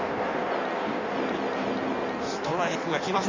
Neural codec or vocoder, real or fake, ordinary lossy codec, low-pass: codec, 16 kHz in and 24 kHz out, 1.1 kbps, FireRedTTS-2 codec; fake; Opus, 64 kbps; 7.2 kHz